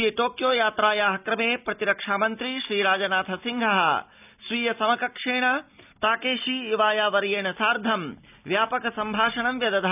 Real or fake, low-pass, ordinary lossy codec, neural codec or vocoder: real; 3.6 kHz; none; none